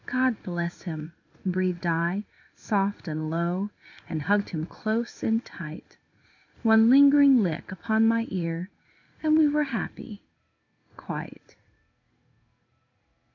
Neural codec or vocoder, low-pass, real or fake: codec, 16 kHz in and 24 kHz out, 1 kbps, XY-Tokenizer; 7.2 kHz; fake